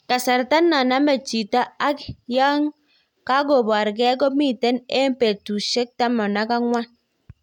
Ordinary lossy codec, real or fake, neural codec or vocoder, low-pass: none; real; none; 19.8 kHz